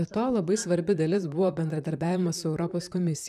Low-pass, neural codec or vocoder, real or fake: 14.4 kHz; vocoder, 44.1 kHz, 128 mel bands, Pupu-Vocoder; fake